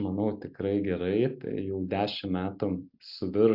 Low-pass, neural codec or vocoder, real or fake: 5.4 kHz; none; real